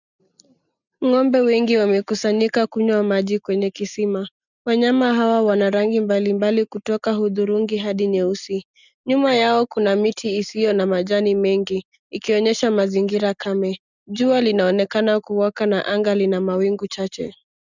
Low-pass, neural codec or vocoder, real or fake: 7.2 kHz; none; real